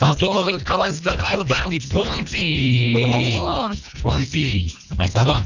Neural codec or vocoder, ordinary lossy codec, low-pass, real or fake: codec, 24 kHz, 1.5 kbps, HILCodec; none; 7.2 kHz; fake